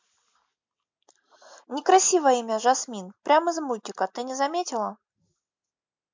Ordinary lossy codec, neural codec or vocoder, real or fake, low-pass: MP3, 64 kbps; none; real; 7.2 kHz